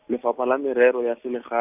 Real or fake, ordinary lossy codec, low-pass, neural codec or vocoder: real; none; 3.6 kHz; none